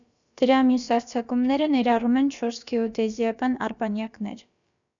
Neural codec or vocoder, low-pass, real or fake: codec, 16 kHz, about 1 kbps, DyCAST, with the encoder's durations; 7.2 kHz; fake